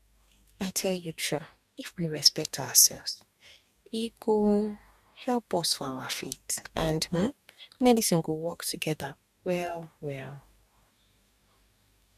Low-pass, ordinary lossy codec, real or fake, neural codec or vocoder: 14.4 kHz; none; fake; codec, 44.1 kHz, 2.6 kbps, DAC